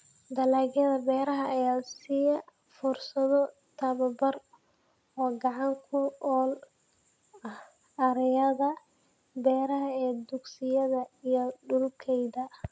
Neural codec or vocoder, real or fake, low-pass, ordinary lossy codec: none; real; none; none